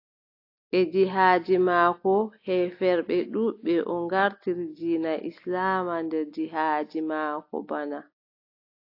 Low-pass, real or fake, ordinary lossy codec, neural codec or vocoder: 5.4 kHz; real; AAC, 32 kbps; none